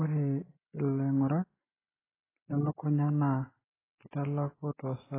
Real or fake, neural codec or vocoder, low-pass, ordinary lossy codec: real; none; 3.6 kHz; AAC, 16 kbps